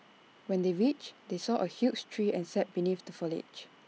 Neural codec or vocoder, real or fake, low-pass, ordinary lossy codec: none; real; none; none